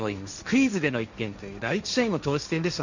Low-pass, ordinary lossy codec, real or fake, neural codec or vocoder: 7.2 kHz; none; fake; codec, 16 kHz, 1.1 kbps, Voila-Tokenizer